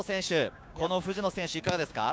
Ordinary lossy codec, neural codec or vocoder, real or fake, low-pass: none; codec, 16 kHz, 6 kbps, DAC; fake; none